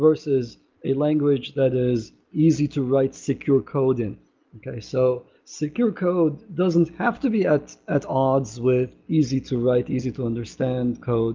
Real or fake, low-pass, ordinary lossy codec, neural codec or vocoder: real; 7.2 kHz; Opus, 32 kbps; none